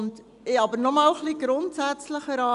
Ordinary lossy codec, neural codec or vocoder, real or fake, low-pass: none; none; real; 10.8 kHz